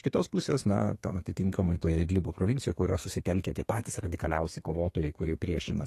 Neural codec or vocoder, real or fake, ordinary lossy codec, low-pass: codec, 32 kHz, 1.9 kbps, SNAC; fake; AAC, 48 kbps; 14.4 kHz